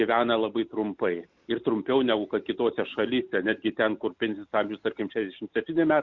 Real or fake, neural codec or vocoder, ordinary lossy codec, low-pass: real; none; Opus, 64 kbps; 7.2 kHz